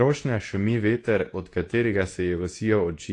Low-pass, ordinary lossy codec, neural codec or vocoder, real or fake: 10.8 kHz; AAC, 48 kbps; codec, 24 kHz, 0.9 kbps, WavTokenizer, medium speech release version 2; fake